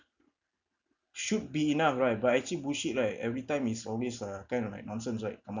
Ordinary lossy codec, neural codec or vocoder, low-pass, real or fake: none; none; 7.2 kHz; real